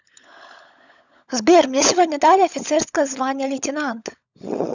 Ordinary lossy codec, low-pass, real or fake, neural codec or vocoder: none; 7.2 kHz; fake; codec, 16 kHz, 16 kbps, FunCodec, trained on LibriTTS, 50 frames a second